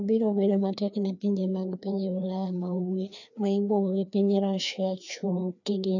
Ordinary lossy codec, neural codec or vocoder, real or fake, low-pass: none; codec, 16 kHz, 2 kbps, FreqCodec, larger model; fake; 7.2 kHz